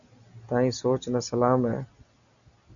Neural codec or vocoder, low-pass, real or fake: none; 7.2 kHz; real